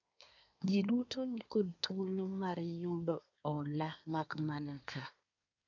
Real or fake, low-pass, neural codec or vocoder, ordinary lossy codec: fake; 7.2 kHz; codec, 24 kHz, 1 kbps, SNAC; none